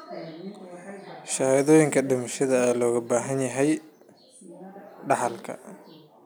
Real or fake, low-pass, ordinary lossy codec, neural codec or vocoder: real; none; none; none